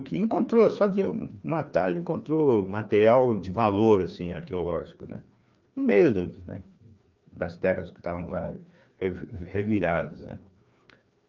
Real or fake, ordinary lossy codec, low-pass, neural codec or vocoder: fake; Opus, 24 kbps; 7.2 kHz; codec, 16 kHz, 2 kbps, FreqCodec, larger model